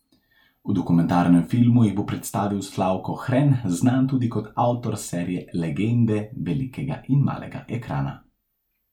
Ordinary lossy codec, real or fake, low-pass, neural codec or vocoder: MP3, 96 kbps; real; 19.8 kHz; none